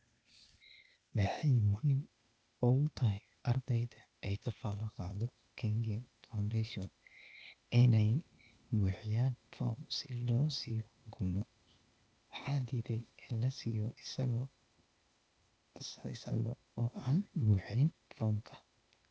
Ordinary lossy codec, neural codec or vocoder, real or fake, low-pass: none; codec, 16 kHz, 0.8 kbps, ZipCodec; fake; none